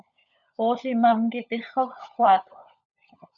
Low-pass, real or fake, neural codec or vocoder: 7.2 kHz; fake; codec, 16 kHz, 16 kbps, FunCodec, trained on LibriTTS, 50 frames a second